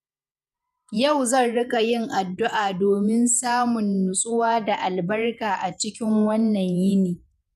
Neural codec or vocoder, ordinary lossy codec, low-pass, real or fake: vocoder, 48 kHz, 128 mel bands, Vocos; none; 14.4 kHz; fake